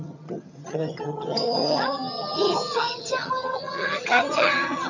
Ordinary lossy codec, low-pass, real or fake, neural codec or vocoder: none; 7.2 kHz; fake; vocoder, 22.05 kHz, 80 mel bands, HiFi-GAN